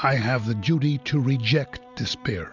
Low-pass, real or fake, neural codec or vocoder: 7.2 kHz; real; none